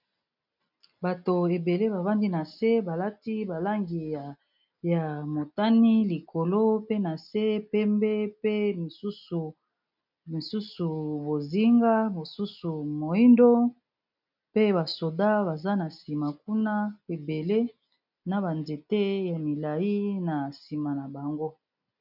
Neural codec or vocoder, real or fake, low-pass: none; real; 5.4 kHz